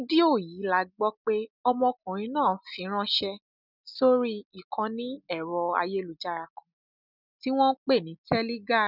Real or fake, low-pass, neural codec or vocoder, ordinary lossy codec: real; 5.4 kHz; none; none